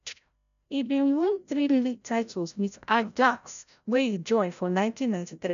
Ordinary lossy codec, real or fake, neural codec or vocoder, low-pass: none; fake; codec, 16 kHz, 0.5 kbps, FreqCodec, larger model; 7.2 kHz